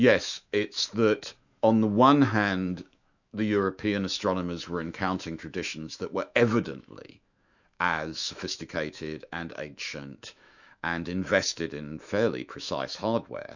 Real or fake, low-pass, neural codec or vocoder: fake; 7.2 kHz; autoencoder, 48 kHz, 128 numbers a frame, DAC-VAE, trained on Japanese speech